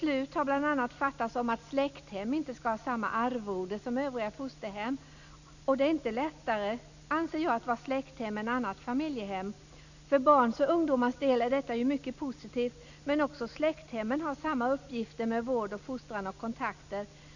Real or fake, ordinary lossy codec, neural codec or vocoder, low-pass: real; none; none; 7.2 kHz